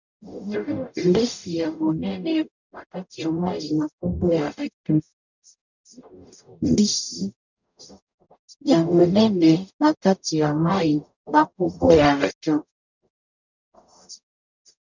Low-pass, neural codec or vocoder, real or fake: 7.2 kHz; codec, 44.1 kHz, 0.9 kbps, DAC; fake